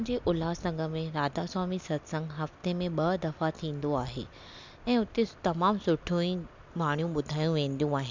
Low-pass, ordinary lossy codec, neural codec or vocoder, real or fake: 7.2 kHz; MP3, 64 kbps; none; real